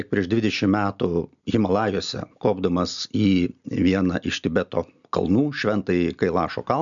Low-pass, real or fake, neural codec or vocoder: 7.2 kHz; real; none